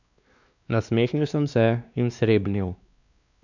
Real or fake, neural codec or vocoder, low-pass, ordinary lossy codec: fake; codec, 16 kHz, 2 kbps, X-Codec, WavLM features, trained on Multilingual LibriSpeech; 7.2 kHz; none